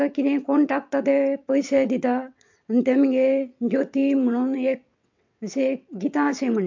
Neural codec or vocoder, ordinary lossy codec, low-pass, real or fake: vocoder, 22.05 kHz, 80 mel bands, WaveNeXt; MP3, 48 kbps; 7.2 kHz; fake